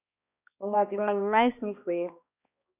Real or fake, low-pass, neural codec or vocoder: fake; 3.6 kHz; codec, 16 kHz, 1 kbps, X-Codec, HuBERT features, trained on balanced general audio